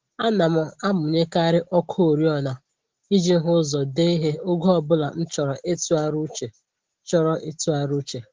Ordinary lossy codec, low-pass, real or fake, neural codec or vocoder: Opus, 16 kbps; 7.2 kHz; real; none